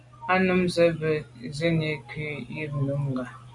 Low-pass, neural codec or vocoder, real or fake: 10.8 kHz; none; real